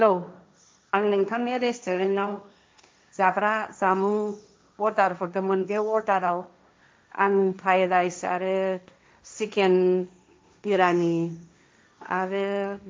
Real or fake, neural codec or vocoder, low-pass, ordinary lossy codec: fake; codec, 16 kHz, 1.1 kbps, Voila-Tokenizer; none; none